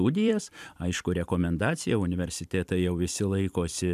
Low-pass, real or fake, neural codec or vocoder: 14.4 kHz; fake; vocoder, 44.1 kHz, 128 mel bands every 256 samples, BigVGAN v2